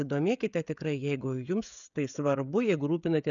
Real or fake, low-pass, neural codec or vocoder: fake; 7.2 kHz; codec, 16 kHz, 16 kbps, FreqCodec, smaller model